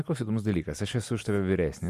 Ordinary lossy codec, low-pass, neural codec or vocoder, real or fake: MP3, 64 kbps; 14.4 kHz; none; real